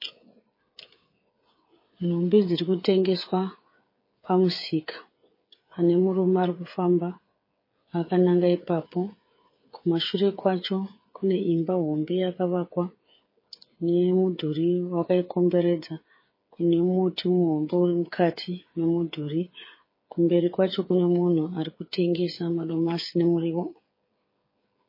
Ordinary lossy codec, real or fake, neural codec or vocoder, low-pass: MP3, 24 kbps; fake; codec, 16 kHz, 8 kbps, FreqCodec, smaller model; 5.4 kHz